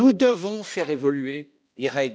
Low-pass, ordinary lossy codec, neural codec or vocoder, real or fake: none; none; codec, 16 kHz, 1 kbps, X-Codec, HuBERT features, trained on balanced general audio; fake